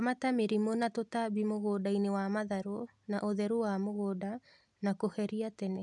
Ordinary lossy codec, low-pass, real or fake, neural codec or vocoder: none; 10.8 kHz; real; none